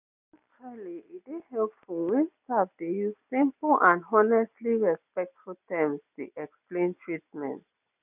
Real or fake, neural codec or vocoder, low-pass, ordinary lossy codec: real; none; 3.6 kHz; none